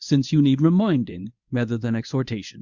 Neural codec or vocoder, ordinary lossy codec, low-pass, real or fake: codec, 24 kHz, 0.9 kbps, WavTokenizer, medium speech release version 1; Opus, 64 kbps; 7.2 kHz; fake